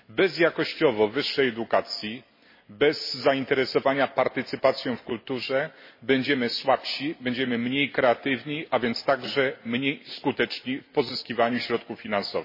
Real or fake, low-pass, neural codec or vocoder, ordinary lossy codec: real; 5.4 kHz; none; MP3, 24 kbps